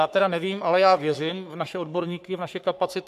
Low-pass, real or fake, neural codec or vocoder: 14.4 kHz; fake; codec, 44.1 kHz, 3.4 kbps, Pupu-Codec